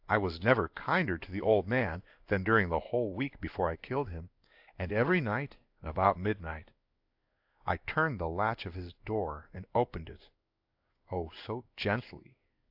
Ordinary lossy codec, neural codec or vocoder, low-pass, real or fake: AAC, 48 kbps; codec, 16 kHz in and 24 kHz out, 1 kbps, XY-Tokenizer; 5.4 kHz; fake